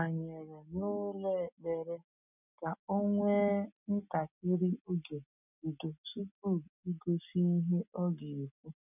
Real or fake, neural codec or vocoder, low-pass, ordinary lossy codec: real; none; 3.6 kHz; none